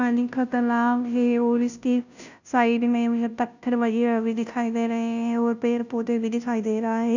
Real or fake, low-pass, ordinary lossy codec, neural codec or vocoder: fake; 7.2 kHz; none; codec, 16 kHz, 0.5 kbps, FunCodec, trained on Chinese and English, 25 frames a second